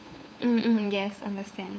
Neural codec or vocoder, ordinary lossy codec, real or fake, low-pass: codec, 16 kHz, 8 kbps, FunCodec, trained on LibriTTS, 25 frames a second; none; fake; none